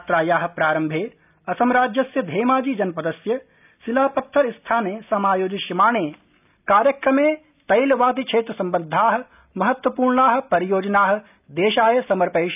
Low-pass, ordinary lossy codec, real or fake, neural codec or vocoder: 3.6 kHz; none; real; none